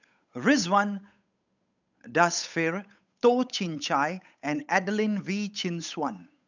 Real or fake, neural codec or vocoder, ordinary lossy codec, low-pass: fake; codec, 16 kHz, 8 kbps, FunCodec, trained on Chinese and English, 25 frames a second; none; 7.2 kHz